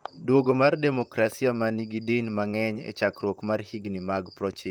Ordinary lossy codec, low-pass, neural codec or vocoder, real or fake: Opus, 32 kbps; 19.8 kHz; vocoder, 44.1 kHz, 128 mel bands, Pupu-Vocoder; fake